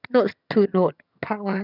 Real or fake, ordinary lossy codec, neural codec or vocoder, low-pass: fake; none; vocoder, 22.05 kHz, 80 mel bands, HiFi-GAN; 5.4 kHz